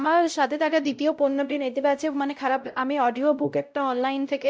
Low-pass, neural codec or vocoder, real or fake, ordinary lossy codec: none; codec, 16 kHz, 0.5 kbps, X-Codec, WavLM features, trained on Multilingual LibriSpeech; fake; none